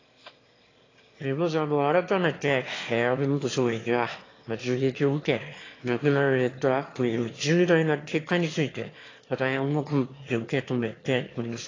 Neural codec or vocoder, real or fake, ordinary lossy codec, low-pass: autoencoder, 22.05 kHz, a latent of 192 numbers a frame, VITS, trained on one speaker; fake; AAC, 32 kbps; 7.2 kHz